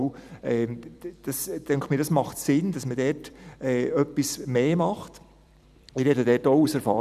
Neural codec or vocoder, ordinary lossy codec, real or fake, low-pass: vocoder, 44.1 kHz, 128 mel bands every 256 samples, BigVGAN v2; none; fake; 14.4 kHz